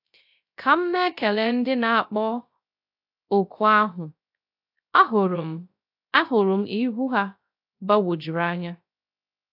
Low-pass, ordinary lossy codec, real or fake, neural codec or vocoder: 5.4 kHz; AAC, 48 kbps; fake; codec, 16 kHz, 0.3 kbps, FocalCodec